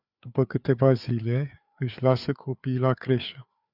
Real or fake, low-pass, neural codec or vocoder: fake; 5.4 kHz; codec, 16 kHz, 4 kbps, FreqCodec, larger model